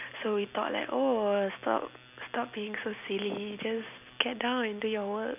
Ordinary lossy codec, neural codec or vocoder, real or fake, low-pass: none; none; real; 3.6 kHz